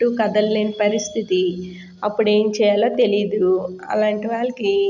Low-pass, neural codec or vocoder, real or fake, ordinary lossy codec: 7.2 kHz; none; real; none